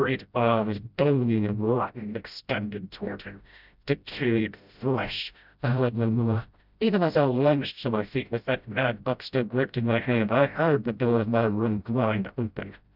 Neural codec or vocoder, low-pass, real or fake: codec, 16 kHz, 0.5 kbps, FreqCodec, smaller model; 5.4 kHz; fake